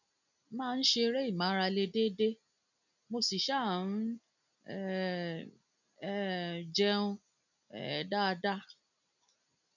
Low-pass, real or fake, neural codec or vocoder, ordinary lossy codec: 7.2 kHz; real; none; none